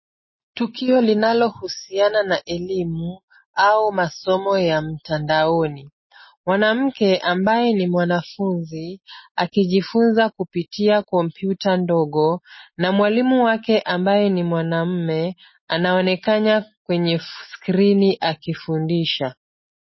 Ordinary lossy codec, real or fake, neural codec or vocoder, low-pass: MP3, 24 kbps; real; none; 7.2 kHz